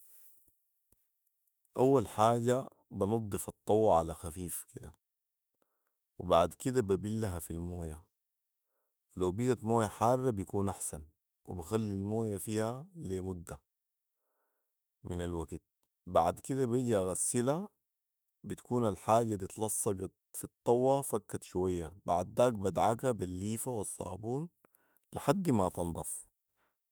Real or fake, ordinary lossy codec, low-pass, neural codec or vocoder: fake; none; none; autoencoder, 48 kHz, 32 numbers a frame, DAC-VAE, trained on Japanese speech